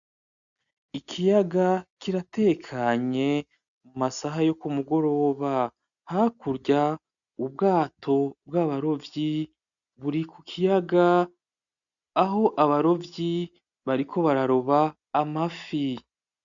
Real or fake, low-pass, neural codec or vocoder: real; 7.2 kHz; none